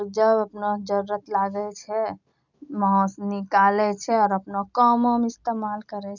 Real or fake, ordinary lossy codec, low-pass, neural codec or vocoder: real; none; 7.2 kHz; none